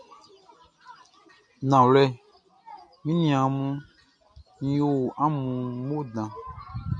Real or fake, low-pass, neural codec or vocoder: real; 9.9 kHz; none